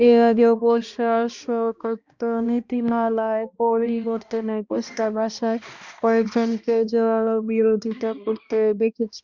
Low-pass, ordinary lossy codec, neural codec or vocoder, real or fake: 7.2 kHz; Opus, 64 kbps; codec, 16 kHz, 1 kbps, X-Codec, HuBERT features, trained on balanced general audio; fake